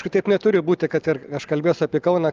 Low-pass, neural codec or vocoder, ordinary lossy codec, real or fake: 7.2 kHz; none; Opus, 24 kbps; real